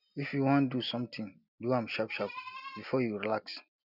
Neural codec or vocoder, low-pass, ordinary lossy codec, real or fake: none; 5.4 kHz; none; real